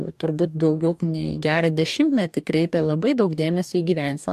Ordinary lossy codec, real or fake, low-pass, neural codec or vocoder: AAC, 96 kbps; fake; 14.4 kHz; codec, 44.1 kHz, 2.6 kbps, DAC